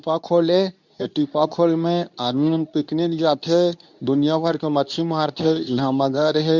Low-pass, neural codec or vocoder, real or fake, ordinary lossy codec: 7.2 kHz; codec, 24 kHz, 0.9 kbps, WavTokenizer, medium speech release version 2; fake; none